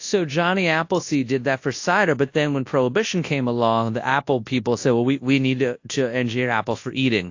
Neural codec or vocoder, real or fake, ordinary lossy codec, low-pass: codec, 24 kHz, 0.9 kbps, WavTokenizer, large speech release; fake; AAC, 48 kbps; 7.2 kHz